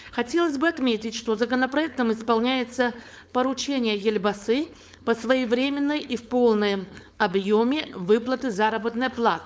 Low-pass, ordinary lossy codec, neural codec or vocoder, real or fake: none; none; codec, 16 kHz, 4.8 kbps, FACodec; fake